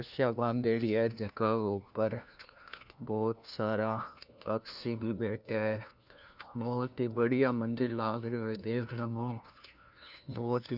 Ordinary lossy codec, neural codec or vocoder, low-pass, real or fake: none; codec, 16 kHz, 1 kbps, FunCodec, trained on LibriTTS, 50 frames a second; 5.4 kHz; fake